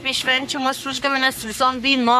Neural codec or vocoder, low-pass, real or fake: codec, 44.1 kHz, 3.4 kbps, Pupu-Codec; 14.4 kHz; fake